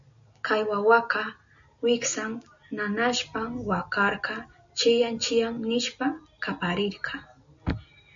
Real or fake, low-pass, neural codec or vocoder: real; 7.2 kHz; none